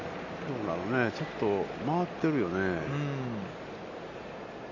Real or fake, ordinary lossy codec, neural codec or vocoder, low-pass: real; none; none; 7.2 kHz